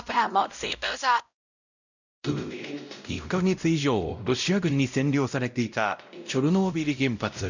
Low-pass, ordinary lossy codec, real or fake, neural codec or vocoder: 7.2 kHz; none; fake; codec, 16 kHz, 0.5 kbps, X-Codec, WavLM features, trained on Multilingual LibriSpeech